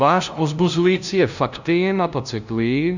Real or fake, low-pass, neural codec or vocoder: fake; 7.2 kHz; codec, 16 kHz, 0.5 kbps, FunCodec, trained on LibriTTS, 25 frames a second